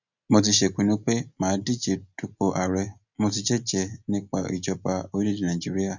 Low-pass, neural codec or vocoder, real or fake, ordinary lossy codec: 7.2 kHz; none; real; none